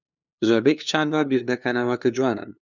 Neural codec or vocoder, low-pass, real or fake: codec, 16 kHz, 2 kbps, FunCodec, trained on LibriTTS, 25 frames a second; 7.2 kHz; fake